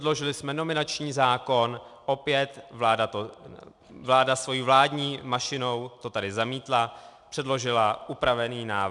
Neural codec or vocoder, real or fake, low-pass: none; real; 10.8 kHz